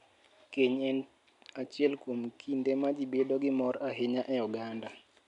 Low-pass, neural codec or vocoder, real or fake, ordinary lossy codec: 10.8 kHz; none; real; none